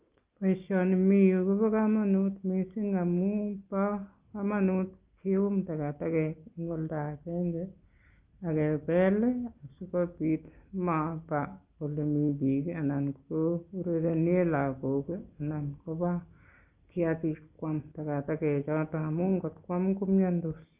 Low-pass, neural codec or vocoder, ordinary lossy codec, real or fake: 3.6 kHz; none; Opus, 32 kbps; real